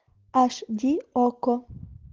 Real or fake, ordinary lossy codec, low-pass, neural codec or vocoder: real; Opus, 16 kbps; 7.2 kHz; none